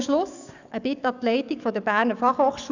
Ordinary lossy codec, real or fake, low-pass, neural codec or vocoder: none; fake; 7.2 kHz; codec, 44.1 kHz, 7.8 kbps, DAC